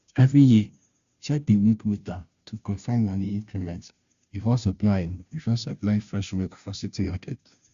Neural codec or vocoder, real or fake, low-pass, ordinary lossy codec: codec, 16 kHz, 0.5 kbps, FunCodec, trained on Chinese and English, 25 frames a second; fake; 7.2 kHz; Opus, 64 kbps